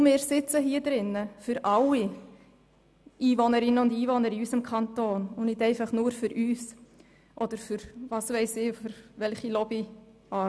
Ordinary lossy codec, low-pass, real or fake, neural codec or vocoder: none; none; real; none